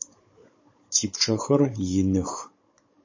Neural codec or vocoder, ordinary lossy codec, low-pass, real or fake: codec, 16 kHz, 16 kbps, FunCodec, trained on Chinese and English, 50 frames a second; MP3, 32 kbps; 7.2 kHz; fake